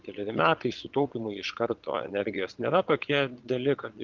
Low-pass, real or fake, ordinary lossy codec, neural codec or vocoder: 7.2 kHz; fake; Opus, 32 kbps; vocoder, 22.05 kHz, 80 mel bands, Vocos